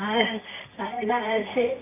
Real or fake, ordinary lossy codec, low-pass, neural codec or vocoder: fake; none; 3.6 kHz; codec, 24 kHz, 0.9 kbps, WavTokenizer, medium music audio release